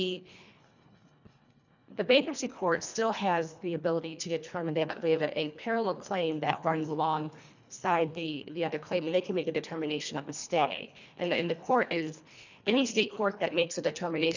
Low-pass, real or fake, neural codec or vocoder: 7.2 kHz; fake; codec, 24 kHz, 1.5 kbps, HILCodec